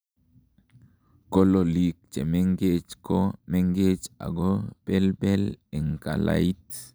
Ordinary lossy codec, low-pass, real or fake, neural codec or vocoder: none; none; real; none